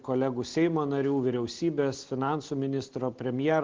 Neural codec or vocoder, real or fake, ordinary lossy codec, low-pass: none; real; Opus, 16 kbps; 7.2 kHz